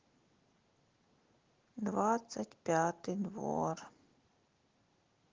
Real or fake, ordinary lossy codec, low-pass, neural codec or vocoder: real; Opus, 16 kbps; 7.2 kHz; none